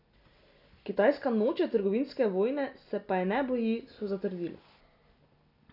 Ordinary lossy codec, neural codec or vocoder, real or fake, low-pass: none; none; real; 5.4 kHz